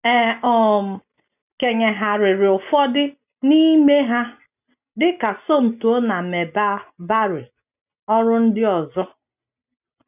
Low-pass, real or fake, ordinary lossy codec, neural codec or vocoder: 3.6 kHz; real; none; none